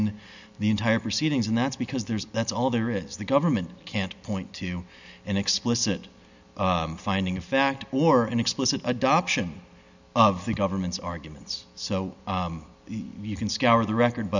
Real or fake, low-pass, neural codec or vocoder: real; 7.2 kHz; none